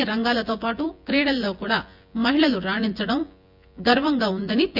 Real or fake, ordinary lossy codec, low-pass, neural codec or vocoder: fake; none; 5.4 kHz; vocoder, 24 kHz, 100 mel bands, Vocos